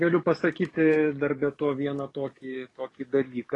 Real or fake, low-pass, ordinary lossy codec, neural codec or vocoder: fake; 10.8 kHz; AAC, 32 kbps; codec, 44.1 kHz, 7.8 kbps, DAC